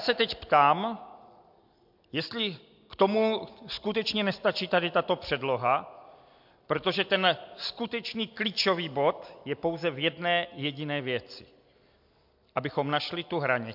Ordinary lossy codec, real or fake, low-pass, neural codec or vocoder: MP3, 48 kbps; real; 5.4 kHz; none